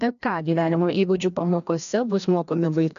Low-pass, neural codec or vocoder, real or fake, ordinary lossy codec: 7.2 kHz; codec, 16 kHz, 1 kbps, FreqCodec, larger model; fake; MP3, 96 kbps